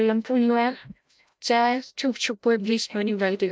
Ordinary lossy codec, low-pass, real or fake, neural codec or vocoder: none; none; fake; codec, 16 kHz, 0.5 kbps, FreqCodec, larger model